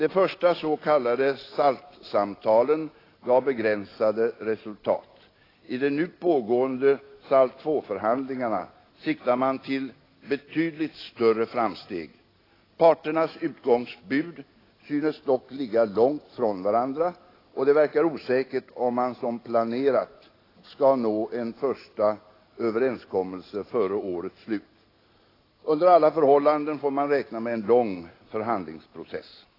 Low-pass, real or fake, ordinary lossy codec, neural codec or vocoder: 5.4 kHz; real; AAC, 24 kbps; none